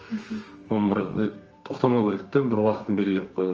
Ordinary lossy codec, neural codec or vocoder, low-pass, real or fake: Opus, 24 kbps; codec, 32 kHz, 1.9 kbps, SNAC; 7.2 kHz; fake